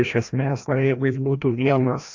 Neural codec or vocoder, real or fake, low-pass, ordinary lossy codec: codec, 16 kHz, 1 kbps, FreqCodec, larger model; fake; 7.2 kHz; AAC, 48 kbps